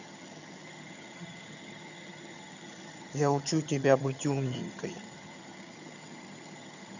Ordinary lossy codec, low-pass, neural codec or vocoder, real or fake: none; 7.2 kHz; vocoder, 22.05 kHz, 80 mel bands, HiFi-GAN; fake